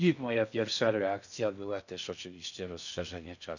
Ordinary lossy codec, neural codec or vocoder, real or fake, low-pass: none; codec, 16 kHz in and 24 kHz out, 0.6 kbps, FocalCodec, streaming, 2048 codes; fake; 7.2 kHz